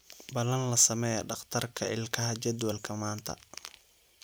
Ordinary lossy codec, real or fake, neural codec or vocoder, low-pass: none; real; none; none